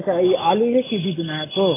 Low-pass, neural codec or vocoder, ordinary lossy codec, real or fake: 3.6 kHz; autoencoder, 48 kHz, 128 numbers a frame, DAC-VAE, trained on Japanese speech; AAC, 16 kbps; fake